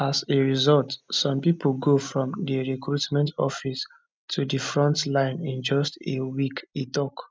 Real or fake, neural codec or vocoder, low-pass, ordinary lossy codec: real; none; none; none